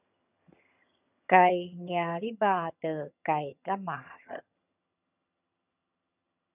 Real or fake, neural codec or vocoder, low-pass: fake; vocoder, 22.05 kHz, 80 mel bands, HiFi-GAN; 3.6 kHz